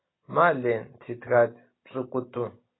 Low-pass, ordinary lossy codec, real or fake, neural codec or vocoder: 7.2 kHz; AAC, 16 kbps; real; none